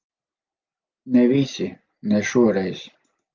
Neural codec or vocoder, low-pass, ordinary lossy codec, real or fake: none; 7.2 kHz; Opus, 24 kbps; real